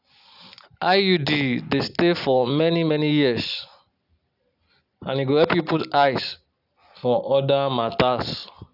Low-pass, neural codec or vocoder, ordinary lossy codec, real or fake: 5.4 kHz; none; none; real